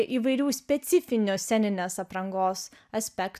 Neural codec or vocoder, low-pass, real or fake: none; 14.4 kHz; real